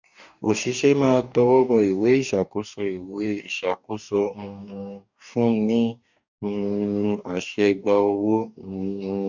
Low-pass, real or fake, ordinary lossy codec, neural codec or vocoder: 7.2 kHz; fake; none; codec, 44.1 kHz, 2.6 kbps, DAC